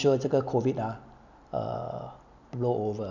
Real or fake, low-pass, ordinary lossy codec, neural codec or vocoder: real; 7.2 kHz; none; none